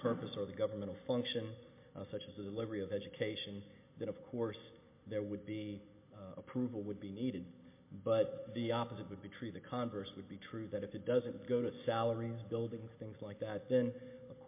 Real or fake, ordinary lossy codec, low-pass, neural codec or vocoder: real; MP3, 24 kbps; 3.6 kHz; none